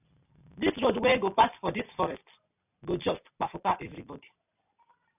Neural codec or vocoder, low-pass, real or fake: none; 3.6 kHz; real